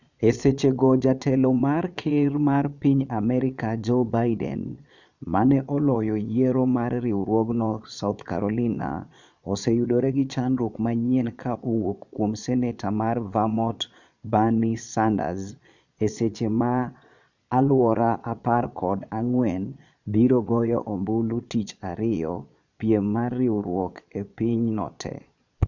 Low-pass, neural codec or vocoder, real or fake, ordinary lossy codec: 7.2 kHz; vocoder, 22.05 kHz, 80 mel bands, Vocos; fake; none